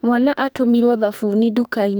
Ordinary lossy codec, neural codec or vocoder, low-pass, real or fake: none; codec, 44.1 kHz, 2.6 kbps, SNAC; none; fake